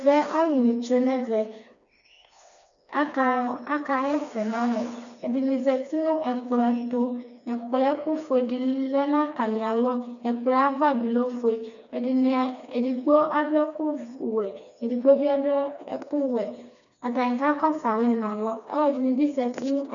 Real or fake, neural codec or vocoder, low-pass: fake; codec, 16 kHz, 2 kbps, FreqCodec, smaller model; 7.2 kHz